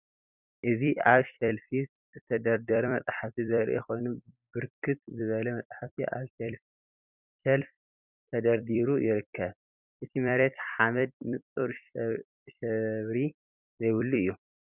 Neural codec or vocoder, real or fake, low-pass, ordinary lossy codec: vocoder, 44.1 kHz, 128 mel bands every 256 samples, BigVGAN v2; fake; 3.6 kHz; Opus, 64 kbps